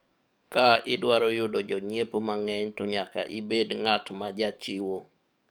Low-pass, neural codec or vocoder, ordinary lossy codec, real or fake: none; codec, 44.1 kHz, 7.8 kbps, DAC; none; fake